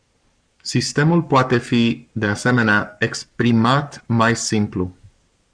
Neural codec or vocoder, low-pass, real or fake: codec, 44.1 kHz, 7.8 kbps, Pupu-Codec; 9.9 kHz; fake